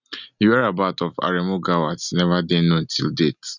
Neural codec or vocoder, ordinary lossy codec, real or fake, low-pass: none; none; real; 7.2 kHz